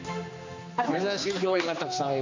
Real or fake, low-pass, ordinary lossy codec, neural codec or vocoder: fake; 7.2 kHz; MP3, 64 kbps; codec, 16 kHz, 2 kbps, X-Codec, HuBERT features, trained on general audio